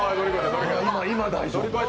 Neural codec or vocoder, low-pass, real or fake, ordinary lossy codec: none; none; real; none